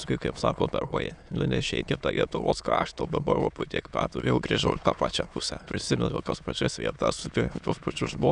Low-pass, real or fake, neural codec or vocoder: 9.9 kHz; fake; autoencoder, 22.05 kHz, a latent of 192 numbers a frame, VITS, trained on many speakers